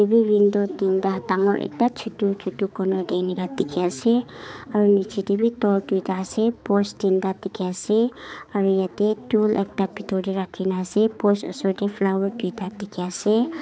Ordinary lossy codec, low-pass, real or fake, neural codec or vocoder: none; none; fake; codec, 16 kHz, 4 kbps, X-Codec, HuBERT features, trained on balanced general audio